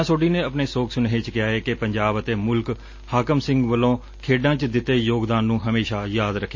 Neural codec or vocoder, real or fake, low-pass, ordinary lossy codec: none; real; 7.2 kHz; MP3, 32 kbps